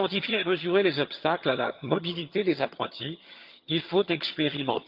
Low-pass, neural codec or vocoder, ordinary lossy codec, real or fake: 5.4 kHz; vocoder, 22.05 kHz, 80 mel bands, HiFi-GAN; Opus, 24 kbps; fake